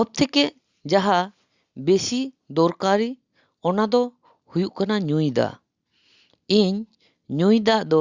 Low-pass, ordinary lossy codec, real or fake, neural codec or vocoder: 7.2 kHz; Opus, 64 kbps; real; none